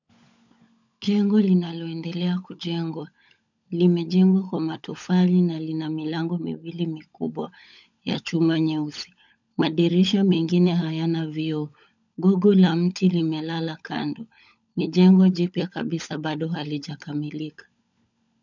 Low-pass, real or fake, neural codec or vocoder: 7.2 kHz; fake; codec, 16 kHz, 16 kbps, FunCodec, trained on LibriTTS, 50 frames a second